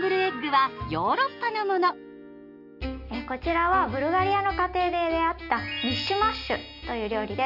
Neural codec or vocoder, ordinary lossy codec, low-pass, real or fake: none; none; 5.4 kHz; real